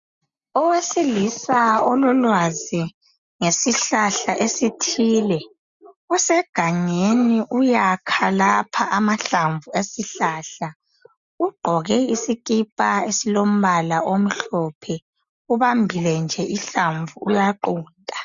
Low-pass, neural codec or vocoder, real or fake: 7.2 kHz; none; real